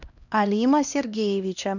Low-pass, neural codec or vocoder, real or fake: 7.2 kHz; codec, 16 kHz, 1 kbps, X-Codec, HuBERT features, trained on LibriSpeech; fake